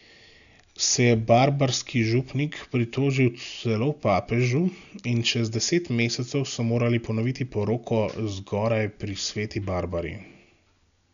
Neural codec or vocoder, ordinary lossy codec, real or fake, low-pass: none; none; real; 7.2 kHz